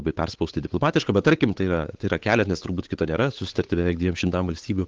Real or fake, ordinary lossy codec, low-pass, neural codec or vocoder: fake; Opus, 16 kbps; 7.2 kHz; codec, 16 kHz, 8 kbps, FunCodec, trained on Chinese and English, 25 frames a second